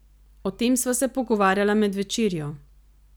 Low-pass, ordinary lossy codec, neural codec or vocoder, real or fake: none; none; none; real